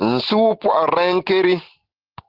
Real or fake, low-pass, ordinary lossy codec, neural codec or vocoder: real; 5.4 kHz; Opus, 16 kbps; none